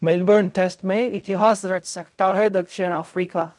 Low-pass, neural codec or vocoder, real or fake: 10.8 kHz; codec, 16 kHz in and 24 kHz out, 0.4 kbps, LongCat-Audio-Codec, fine tuned four codebook decoder; fake